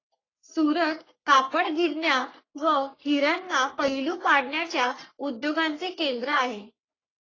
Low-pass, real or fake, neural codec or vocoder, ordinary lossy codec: 7.2 kHz; fake; codec, 44.1 kHz, 3.4 kbps, Pupu-Codec; AAC, 32 kbps